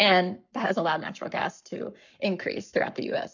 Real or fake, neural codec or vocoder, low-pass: fake; codec, 44.1 kHz, 7.8 kbps, Pupu-Codec; 7.2 kHz